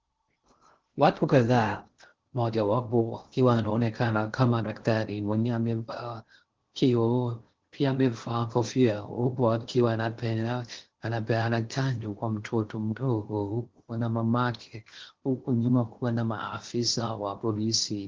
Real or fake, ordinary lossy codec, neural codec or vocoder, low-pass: fake; Opus, 16 kbps; codec, 16 kHz in and 24 kHz out, 0.6 kbps, FocalCodec, streaming, 2048 codes; 7.2 kHz